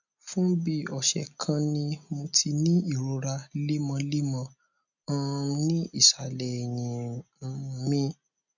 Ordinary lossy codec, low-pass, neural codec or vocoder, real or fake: none; 7.2 kHz; none; real